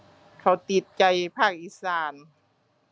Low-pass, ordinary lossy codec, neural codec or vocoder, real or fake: none; none; none; real